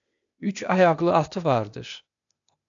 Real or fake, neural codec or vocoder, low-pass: fake; codec, 16 kHz, 0.8 kbps, ZipCodec; 7.2 kHz